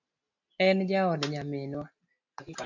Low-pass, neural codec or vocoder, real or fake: 7.2 kHz; none; real